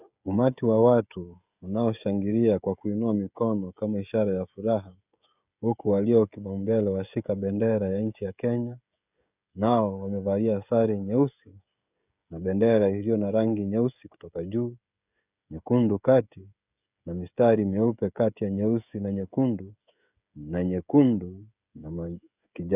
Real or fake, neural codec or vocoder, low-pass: fake; codec, 16 kHz, 16 kbps, FreqCodec, smaller model; 3.6 kHz